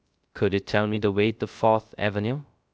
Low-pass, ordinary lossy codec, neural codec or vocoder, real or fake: none; none; codec, 16 kHz, 0.3 kbps, FocalCodec; fake